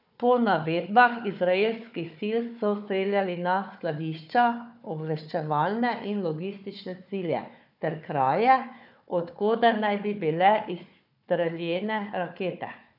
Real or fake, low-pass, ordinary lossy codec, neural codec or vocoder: fake; 5.4 kHz; none; codec, 16 kHz, 4 kbps, FunCodec, trained on Chinese and English, 50 frames a second